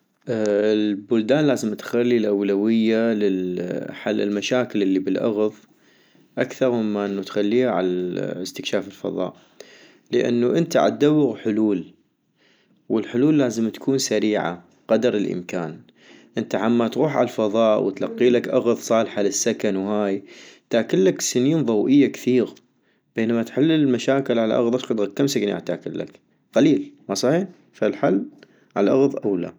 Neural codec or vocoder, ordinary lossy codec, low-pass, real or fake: none; none; none; real